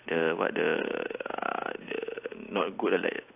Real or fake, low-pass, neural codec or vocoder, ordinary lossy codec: real; 3.6 kHz; none; AAC, 24 kbps